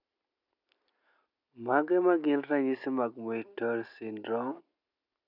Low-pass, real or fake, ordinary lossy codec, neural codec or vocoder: 5.4 kHz; real; none; none